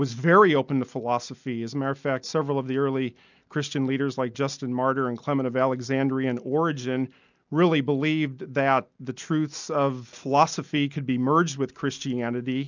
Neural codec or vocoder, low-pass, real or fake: none; 7.2 kHz; real